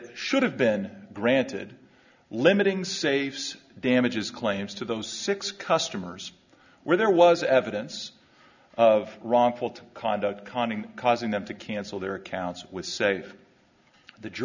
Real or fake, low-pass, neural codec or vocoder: real; 7.2 kHz; none